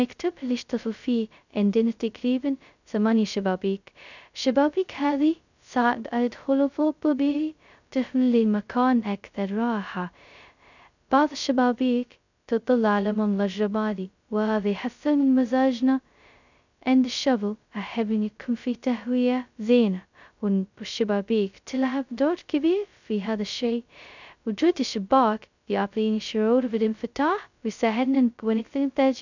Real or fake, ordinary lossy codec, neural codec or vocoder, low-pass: fake; none; codec, 16 kHz, 0.2 kbps, FocalCodec; 7.2 kHz